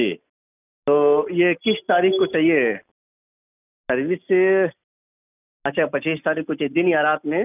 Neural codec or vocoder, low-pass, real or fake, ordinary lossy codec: none; 3.6 kHz; real; none